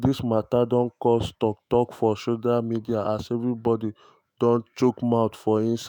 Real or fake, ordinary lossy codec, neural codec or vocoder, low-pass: fake; none; autoencoder, 48 kHz, 128 numbers a frame, DAC-VAE, trained on Japanese speech; none